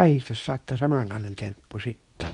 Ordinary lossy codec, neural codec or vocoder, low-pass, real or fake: none; codec, 24 kHz, 0.9 kbps, WavTokenizer, medium speech release version 2; 10.8 kHz; fake